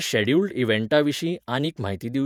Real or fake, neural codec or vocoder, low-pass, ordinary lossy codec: fake; vocoder, 48 kHz, 128 mel bands, Vocos; 19.8 kHz; none